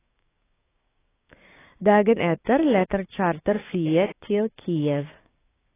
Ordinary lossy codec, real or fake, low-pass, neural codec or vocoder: AAC, 16 kbps; fake; 3.6 kHz; codec, 16 kHz, 6 kbps, DAC